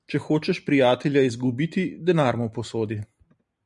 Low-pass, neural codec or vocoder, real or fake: 10.8 kHz; none; real